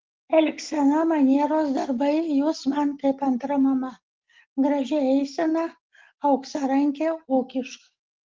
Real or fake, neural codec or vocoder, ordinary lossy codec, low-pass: real; none; Opus, 16 kbps; 7.2 kHz